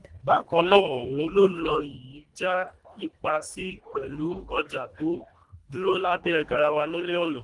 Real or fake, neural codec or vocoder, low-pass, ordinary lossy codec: fake; codec, 24 kHz, 1.5 kbps, HILCodec; 10.8 kHz; Opus, 24 kbps